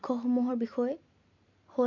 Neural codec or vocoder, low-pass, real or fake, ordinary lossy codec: none; 7.2 kHz; real; MP3, 48 kbps